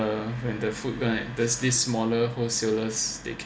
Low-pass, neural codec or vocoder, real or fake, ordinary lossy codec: none; none; real; none